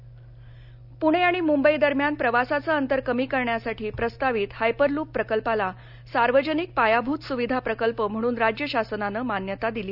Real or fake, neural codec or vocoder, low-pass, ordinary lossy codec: real; none; 5.4 kHz; none